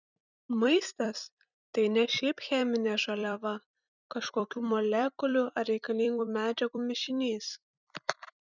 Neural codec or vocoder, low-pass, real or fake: vocoder, 44.1 kHz, 128 mel bands every 256 samples, BigVGAN v2; 7.2 kHz; fake